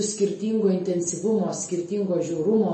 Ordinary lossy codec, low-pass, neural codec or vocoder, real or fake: MP3, 32 kbps; 10.8 kHz; none; real